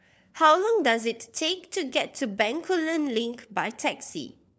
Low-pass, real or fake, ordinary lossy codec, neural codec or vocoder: none; fake; none; codec, 16 kHz, 4 kbps, FunCodec, trained on LibriTTS, 50 frames a second